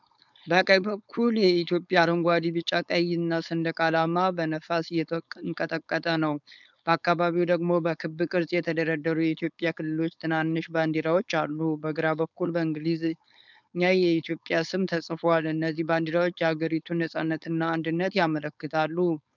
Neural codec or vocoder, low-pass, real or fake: codec, 16 kHz, 4.8 kbps, FACodec; 7.2 kHz; fake